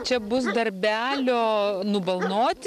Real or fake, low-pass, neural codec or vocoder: real; 14.4 kHz; none